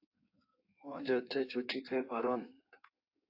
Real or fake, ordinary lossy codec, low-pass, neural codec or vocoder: fake; AAC, 32 kbps; 5.4 kHz; codec, 16 kHz in and 24 kHz out, 1.1 kbps, FireRedTTS-2 codec